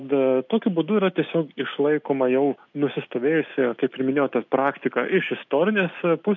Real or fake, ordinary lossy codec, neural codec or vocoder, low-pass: real; MP3, 64 kbps; none; 7.2 kHz